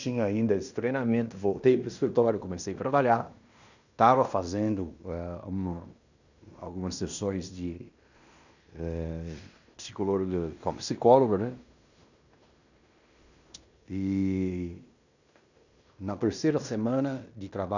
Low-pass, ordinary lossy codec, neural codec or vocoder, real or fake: 7.2 kHz; none; codec, 16 kHz in and 24 kHz out, 0.9 kbps, LongCat-Audio-Codec, fine tuned four codebook decoder; fake